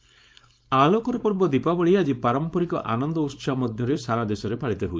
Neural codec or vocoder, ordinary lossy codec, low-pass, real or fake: codec, 16 kHz, 4.8 kbps, FACodec; none; none; fake